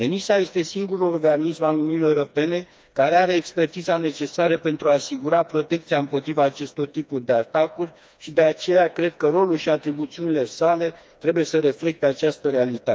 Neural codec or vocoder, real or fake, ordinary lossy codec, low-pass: codec, 16 kHz, 2 kbps, FreqCodec, smaller model; fake; none; none